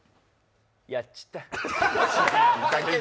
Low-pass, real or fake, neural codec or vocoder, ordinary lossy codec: none; real; none; none